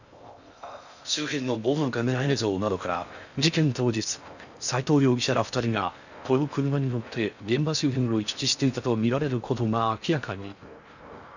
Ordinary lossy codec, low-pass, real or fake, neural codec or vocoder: none; 7.2 kHz; fake; codec, 16 kHz in and 24 kHz out, 0.6 kbps, FocalCodec, streaming, 4096 codes